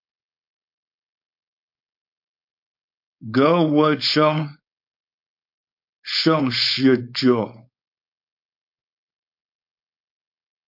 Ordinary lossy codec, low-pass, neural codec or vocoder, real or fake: MP3, 48 kbps; 5.4 kHz; codec, 16 kHz, 4.8 kbps, FACodec; fake